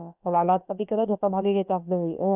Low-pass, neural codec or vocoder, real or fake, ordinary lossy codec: 3.6 kHz; codec, 16 kHz, about 1 kbps, DyCAST, with the encoder's durations; fake; none